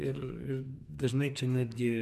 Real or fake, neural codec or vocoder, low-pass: fake; codec, 44.1 kHz, 2.6 kbps, SNAC; 14.4 kHz